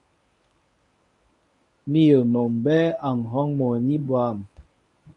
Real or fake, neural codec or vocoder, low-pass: fake; codec, 24 kHz, 0.9 kbps, WavTokenizer, medium speech release version 1; 10.8 kHz